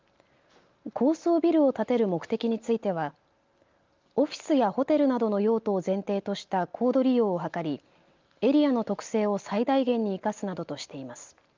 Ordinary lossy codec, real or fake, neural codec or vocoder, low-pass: Opus, 24 kbps; real; none; 7.2 kHz